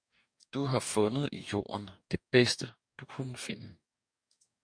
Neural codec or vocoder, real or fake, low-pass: codec, 44.1 kHz, 2.6 kbps, DAC; fake; 9.9 kHz